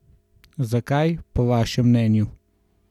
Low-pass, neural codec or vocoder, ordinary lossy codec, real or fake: 19.8 kHz; none; none; real